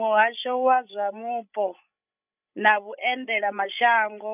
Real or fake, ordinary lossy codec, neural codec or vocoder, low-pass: fake; none; codec, 16 kHz, 16 kbps, FreqCodec, larger model; 3.6 kHz